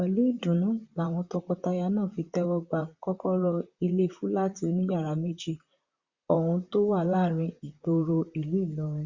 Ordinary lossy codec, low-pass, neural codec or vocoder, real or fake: Opus, 64 kbps; 7.2 kHz; vocoder, 44.1 kHz, 128 mel bands, Pupu-Vocoder; fake